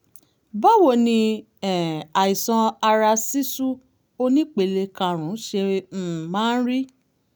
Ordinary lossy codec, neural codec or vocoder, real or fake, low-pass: none; none; real; none